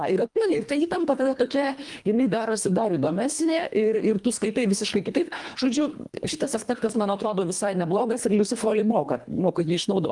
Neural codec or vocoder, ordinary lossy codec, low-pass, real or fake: codec, 24 kHz, 1.5 kbps, HILCodec; Opus, 32 kbps; 10.8 kHz; fake